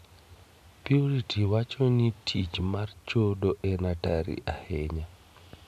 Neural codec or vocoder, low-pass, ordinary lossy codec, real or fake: none; 14.4 kHz; none; real